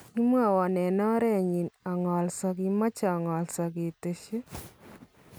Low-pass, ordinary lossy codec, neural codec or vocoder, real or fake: none; none; none; real